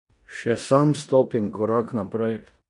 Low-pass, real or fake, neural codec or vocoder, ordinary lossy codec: 10.8 kHz; fake; codec, 16 kHz in and 24 kHz out, 0.9 kbps, LongCat-Audio-Codec, four codebook decoder; none